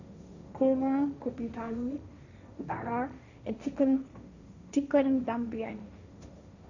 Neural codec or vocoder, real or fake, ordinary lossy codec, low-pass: codec, 16 kHz, 1.1 kbps, Voila-Tokenizer; fake; none; 7.2 kHz